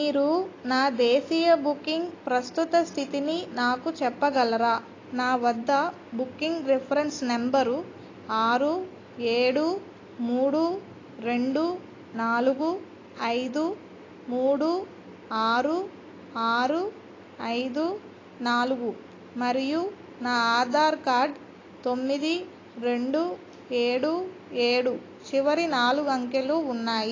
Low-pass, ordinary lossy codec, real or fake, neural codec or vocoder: 7.2 kHz; AAC, 32 kbps; real; none